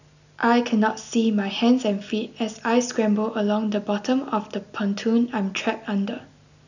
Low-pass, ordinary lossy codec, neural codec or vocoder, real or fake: 7.2 kHz; none; none; real